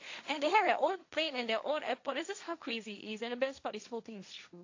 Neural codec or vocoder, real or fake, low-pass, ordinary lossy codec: codec, 16 kHz, 1.1 kbps, Voila-Tokenizer; fake; 7.2 kHz; AAC, 48 kbps